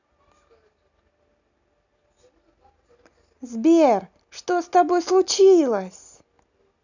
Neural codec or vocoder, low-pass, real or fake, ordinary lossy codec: none; 7.2 kHz; real; none